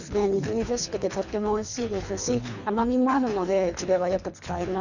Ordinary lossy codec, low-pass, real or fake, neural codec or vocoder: none; 7.2 kHz; fake; codec, 24 kHz, 3 kbps, HILCodec